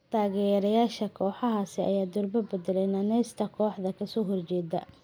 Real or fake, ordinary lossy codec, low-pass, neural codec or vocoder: real; none; none; none